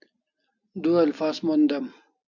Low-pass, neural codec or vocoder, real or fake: 7.2 kHz; none; real